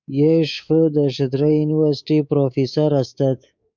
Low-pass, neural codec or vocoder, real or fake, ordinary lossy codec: 7.2 kHz; codec, 24 kHz, 3.1 kbps, DualCodec; fake; MP3, 64 kbps